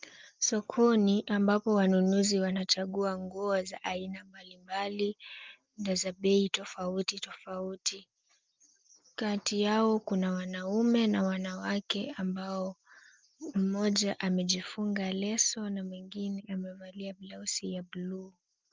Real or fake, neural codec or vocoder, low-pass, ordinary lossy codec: real; none; 7.2 kHz; Opus, 24 kbps